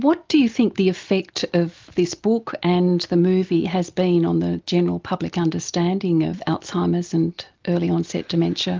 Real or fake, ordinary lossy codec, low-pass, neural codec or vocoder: real; Opus, 24 kbps; 7.2 kHz; none